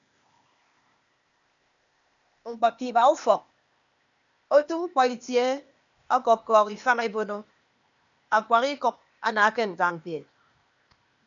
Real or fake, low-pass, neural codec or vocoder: fake; 7.2 kHz; codec, 16 kHz, 0.8 kbps, ZipCodec